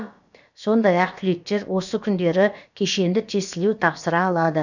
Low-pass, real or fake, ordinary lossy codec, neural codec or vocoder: 7.2 kHz; fake; none; codec, 16 kHz, about 1 kbps, DyCAST, with the encoder's durations